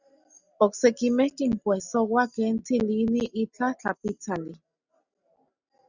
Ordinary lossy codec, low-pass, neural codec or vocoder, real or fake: Opus, 64 kbps; 7.2 kHz; none; real